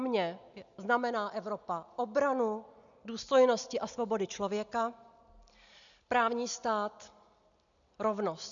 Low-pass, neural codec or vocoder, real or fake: 7.2 kHz; none; real